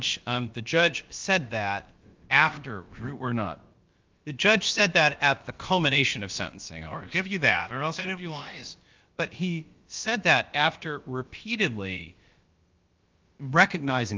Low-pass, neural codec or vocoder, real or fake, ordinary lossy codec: 7.2 kHz; codec, 16 kHz, about 1 kbps, DyCAST, with the encoder's durations; fake; Opus, 32 kbps